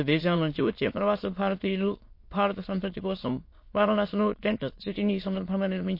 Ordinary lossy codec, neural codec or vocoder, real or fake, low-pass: MP3, 32 kbps; autoencoder, 22.05 kHz, a latent of 192 numbers a frame, VITS, trained on many speakers; fake; 5.4 kHz